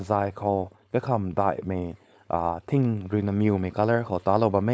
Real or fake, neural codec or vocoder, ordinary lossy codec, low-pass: fake; codec, 16 kHz, 4.8 kbps, FACodec; none; none